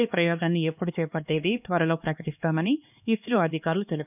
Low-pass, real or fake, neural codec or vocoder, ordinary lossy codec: 3.6 kHz; fake; codec, 16 kHz, 2 kbps, X-Codec, HuBERT features, trained on LibriSpeech; none